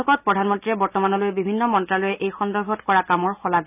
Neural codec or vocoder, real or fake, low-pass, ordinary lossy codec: none; real; 3.6 kHz; none